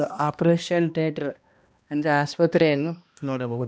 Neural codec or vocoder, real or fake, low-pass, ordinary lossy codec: codec, 16 kHz, 1 kbps, X-Codec, HuBERT features, trained on balanced general audio; fake; none; none